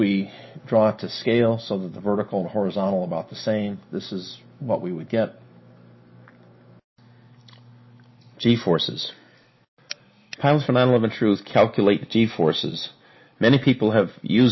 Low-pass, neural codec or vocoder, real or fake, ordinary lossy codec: 7.2 kHz; none; real; MP3, 24 kbps